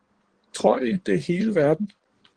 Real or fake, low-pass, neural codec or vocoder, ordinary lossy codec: fake; 9.9 kHz; codec, 16 kHz in and 24 kHz out, 2.2 kbps, FireRedTTS-2 codec; Opus, 16 kbps